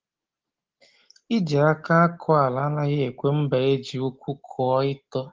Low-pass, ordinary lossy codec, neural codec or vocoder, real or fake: 7.2 kHz; Opus, 16 kbps; none; real